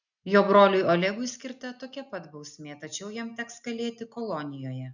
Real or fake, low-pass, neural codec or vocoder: real; 7.2 kHz; none